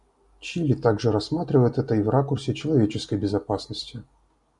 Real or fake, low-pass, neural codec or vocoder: real; 10.8 kHz; none